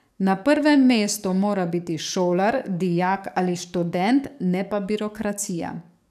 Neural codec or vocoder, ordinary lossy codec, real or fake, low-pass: codec, 44.1 kHz, 7.8 kbps, DAC; none; fake; 14.4 kHz